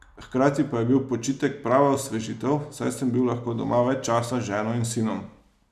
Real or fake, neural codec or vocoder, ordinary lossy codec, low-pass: real; none; none; 14.4 kHz